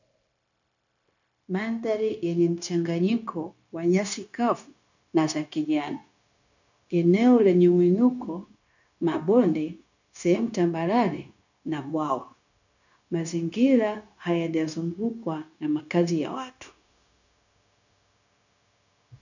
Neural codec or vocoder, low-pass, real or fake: codec, 16 kHz, 0.9 kbps, LongCat-Audio-Codec; 7.2 kHz; fake